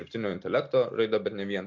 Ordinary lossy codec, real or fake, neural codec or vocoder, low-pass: MP3, 48 kbps; real; none; 7.2 kHz